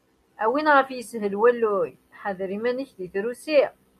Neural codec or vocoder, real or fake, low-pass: none; real; 14.4 kHz